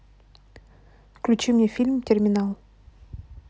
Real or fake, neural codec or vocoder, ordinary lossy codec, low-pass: real; none; none; none